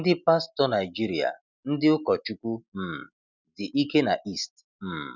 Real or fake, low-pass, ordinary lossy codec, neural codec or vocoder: real; 7.2 kHz; none; none